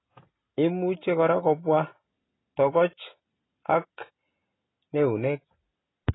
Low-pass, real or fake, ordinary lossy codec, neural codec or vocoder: 7.2 kHz; real; AAC, 16 kbps; none